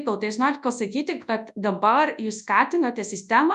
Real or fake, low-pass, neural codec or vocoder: fake; 10.8 kHz; codec, 24 kHz, 0.9 kbps, WavTokenizer, large speech release